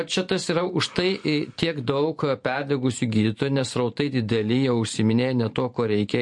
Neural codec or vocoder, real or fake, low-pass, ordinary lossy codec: vocoder, 44.1 kHz, 128 mel bands every 512 samples, BigVGAN v2; fake; 10.8 kHz; MP3, 48 kbps